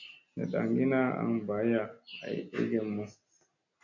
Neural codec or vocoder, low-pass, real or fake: none; 7.2 kHz; real